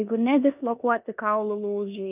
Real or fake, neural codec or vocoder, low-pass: fake; codec, 16 kHz in and 24 kHz out, 0.9 kbps, LongCat-Audio-Codec, four codebook decoder; 3.6 kHz